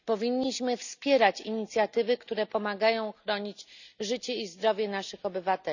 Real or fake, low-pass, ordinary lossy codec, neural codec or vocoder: real; 7.2 kHz; none; none